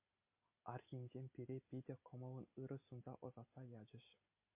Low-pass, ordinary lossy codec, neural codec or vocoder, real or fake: 3.6 kHz; MP3, 24 kbps; none; real